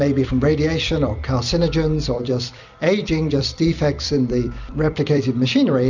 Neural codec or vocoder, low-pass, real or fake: vocoder, 44.1 kHz, 128 mel bands every 256 samples, BigVGAN v2; 7.2 kHz; fake